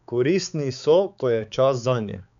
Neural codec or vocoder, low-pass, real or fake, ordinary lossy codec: codec, 16 kHz, 4 kbps, X-Codec, HuBERT features, trained on balanced general audio; 7.2 kHz; fake; none